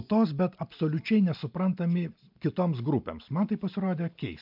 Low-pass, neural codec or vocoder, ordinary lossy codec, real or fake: 5.4 kHz; none; MP3, 48 kbps; real